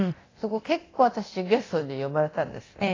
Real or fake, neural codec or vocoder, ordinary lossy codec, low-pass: fake; codec, 24 kHz, 0.9 kbps, DualCodec; AAC, 32 kbps; 7.2 kHz